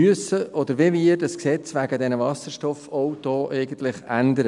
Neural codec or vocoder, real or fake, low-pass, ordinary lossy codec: none; real; 10.8 kHz; none